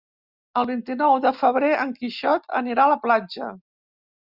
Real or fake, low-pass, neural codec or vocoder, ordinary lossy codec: real; 5.4 kHz; none; Opus, 64 kbps